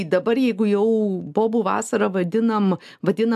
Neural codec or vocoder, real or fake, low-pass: none; real; 14.4 kHz